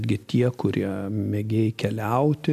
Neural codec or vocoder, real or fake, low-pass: none; real; 14.4 kHz